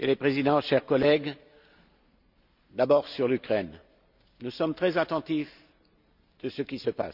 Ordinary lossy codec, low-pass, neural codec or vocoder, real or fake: none; 5.4 kHz; none; real